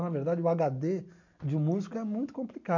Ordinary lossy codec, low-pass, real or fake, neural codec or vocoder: none; 7.2 kHz; real; none